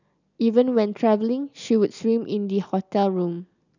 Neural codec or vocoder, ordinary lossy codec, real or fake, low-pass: none; none; real; 7.2 kHz